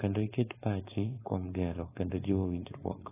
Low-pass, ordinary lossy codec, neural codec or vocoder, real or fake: 3.6 kHz; AAC, 16 kbps; codec, 16 kHz, 4.8 kbps, FACodec; fake